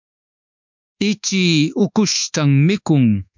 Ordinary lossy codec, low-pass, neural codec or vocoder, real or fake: MP3, 64 kbps; 7.2 kHz; codec, 24 kHz, 1.2 kbps, DualCodec; fake